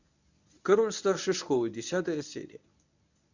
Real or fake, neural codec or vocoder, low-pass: fake; codec, 24 kHz, 0.9 kbps, WavTokenizer, medium speech release version 1; 7.2 kHz